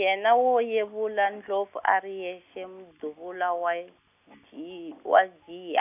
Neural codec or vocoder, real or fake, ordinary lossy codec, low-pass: none; real; none; 3.6 kHz